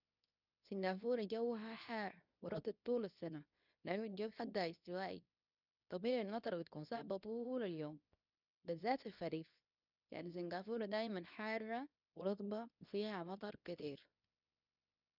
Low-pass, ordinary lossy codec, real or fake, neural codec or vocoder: 5.4 kHz; none; fake; codec, 24 kHz, 0.9 kbps, WavTokenizer, medium speech release version 2